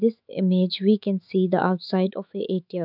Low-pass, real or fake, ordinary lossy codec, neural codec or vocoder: 5.4 kHz; fake; none; autoencoder, 48 kHz, 128 numbers a frame, DAC-VAE, trained on Japanese speech